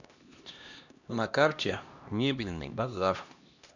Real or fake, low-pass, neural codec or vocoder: fake; 7.2 kHz; codec, 16 kHz, 1 kbps, X-Codec, HuBERT features, trained on LibriSpeech